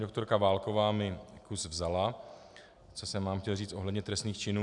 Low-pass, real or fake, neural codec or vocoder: 10.8 kHz; real; none